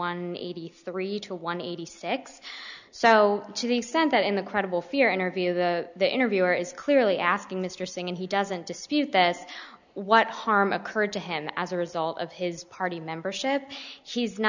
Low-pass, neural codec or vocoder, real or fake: 7.2 kHz; none; real